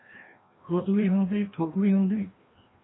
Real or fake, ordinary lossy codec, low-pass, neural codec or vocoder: fake; AAC, 16 kbps; 7.2 kHz; codec, 16 kHz, 1 kbps, FreqCodec, larger model